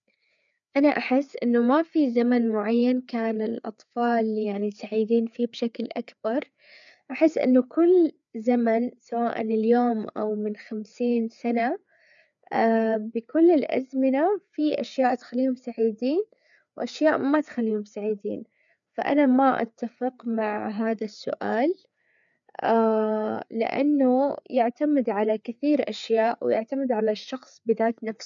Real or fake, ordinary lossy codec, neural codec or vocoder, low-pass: fake; none; codec, 16 kHz, 4 kbps, FreqCodec, larger model; 7.2 kHz